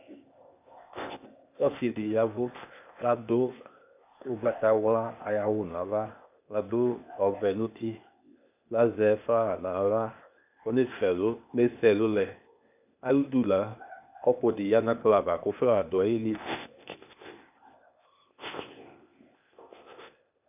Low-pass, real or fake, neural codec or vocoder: 3.6 kHz; fake; codec, 16 kHz, 0.8 kbps, ZipCodec